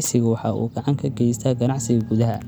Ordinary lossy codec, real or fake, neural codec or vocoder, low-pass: none; real; none; none